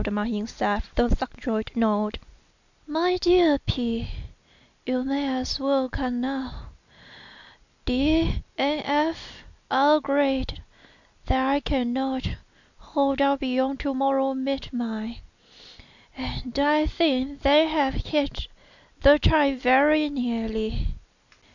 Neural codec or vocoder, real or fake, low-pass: none; real; 7.2 kHz